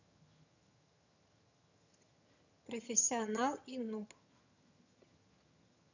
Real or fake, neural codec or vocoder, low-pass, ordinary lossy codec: fake; vocoder, 22.05 kHz, 80 mel bands, HiFi-GAN; 7.2 kHz; none